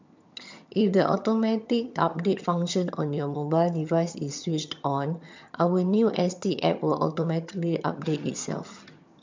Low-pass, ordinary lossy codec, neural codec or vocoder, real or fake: 7.2 kHz; MP3, 64 kbps; vocoder, 22.05 kHz, 80 mel bands, HiFi-GAN; fake